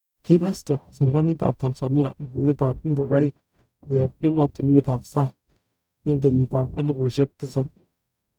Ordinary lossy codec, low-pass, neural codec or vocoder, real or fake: none; 19.8 kHz; codec, 44.1 kHz, 0.9 kbps, DAC; fake